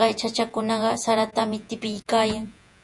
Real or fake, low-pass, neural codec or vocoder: fake; 10.8 kHz; vocoder, 48 kHz, 128 mel bands, Vocos